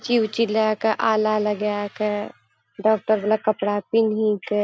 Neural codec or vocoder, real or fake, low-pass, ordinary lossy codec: none; real; none; none